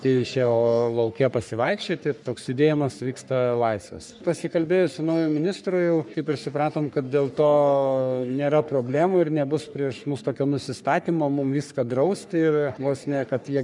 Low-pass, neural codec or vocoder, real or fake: 10.8 kHz; codec, 44.1 kHz, 3.4 kbps, Pupu-Codec; fake